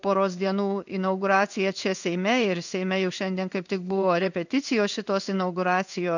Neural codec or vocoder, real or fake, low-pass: codec, 16 kHz in and 24 kHz out, 1 kbps, XY-Tokenizer; fake; 7.2 kHz